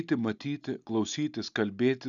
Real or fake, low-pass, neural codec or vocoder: real; 7.2 kHz; none